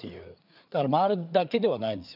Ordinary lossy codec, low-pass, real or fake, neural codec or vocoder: none; 5.4 kHz; fake; codec, 16 kHz, 4 kbps, FreqCodec, larger model